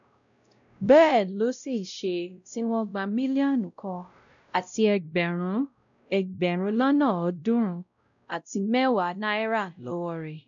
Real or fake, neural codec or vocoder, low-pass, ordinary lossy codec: fake; codec, 16 kHz, 0.5 kbps, X-Codec, WavLM features, trained on Multilingual LibriSpeech; 7.2 kHz; none